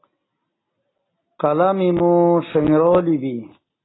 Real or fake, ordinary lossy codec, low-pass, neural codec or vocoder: real; AAC, 16 kbps; 7.2 kHz; none